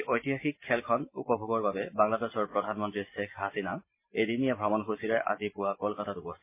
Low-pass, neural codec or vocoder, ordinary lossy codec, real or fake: 3.6 kHz; none; MP3, 24 kbps; real